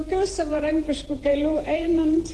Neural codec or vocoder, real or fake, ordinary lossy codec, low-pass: vocoder, 48 kHz, 128 mel bands, Vocos; fake; Opus, 16 kbps; 10.8 kHz